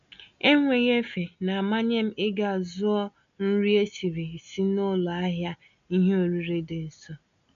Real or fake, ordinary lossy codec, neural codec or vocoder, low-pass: real; none; none; 7.2 kHz